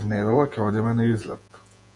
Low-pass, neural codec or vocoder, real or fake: 10.8 kHz; vocoder, 48 kHz, 128 mel bands, Vocos; fake